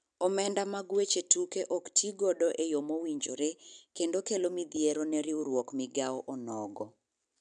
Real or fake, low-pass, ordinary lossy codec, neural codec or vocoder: real; 9.9 kHz; none; none